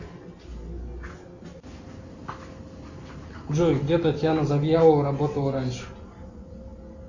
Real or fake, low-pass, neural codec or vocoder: real; 7.2 kHz; none